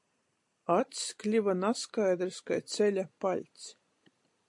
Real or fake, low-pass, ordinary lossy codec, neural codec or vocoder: real; 10.8 kHz; AAC, 64 kbps; none